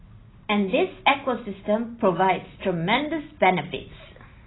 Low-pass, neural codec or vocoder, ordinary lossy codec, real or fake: 7.2 kHz; none; AAC, 16 kbps; real